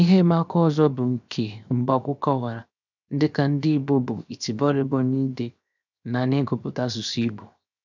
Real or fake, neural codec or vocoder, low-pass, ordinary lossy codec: fake; codec, 16 kHz, 0.7 kbps, FocalCodec; 7.2 kHz; none